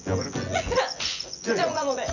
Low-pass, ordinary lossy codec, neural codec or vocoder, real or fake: 7.2 kHz; none; vocoder, 24 kHz, 100 mel bands, Vocos; fake